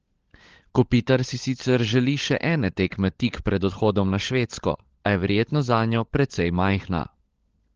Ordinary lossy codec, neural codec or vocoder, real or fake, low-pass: Opus, 16 kbps; codec, 16 kHz, 16 kbps, FunCodec, trained on LibriTTS, 50 frames a second; fake; 7.2 kHz